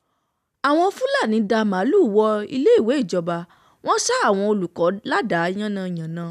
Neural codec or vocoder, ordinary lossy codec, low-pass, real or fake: none; none; 14.4 kHz; real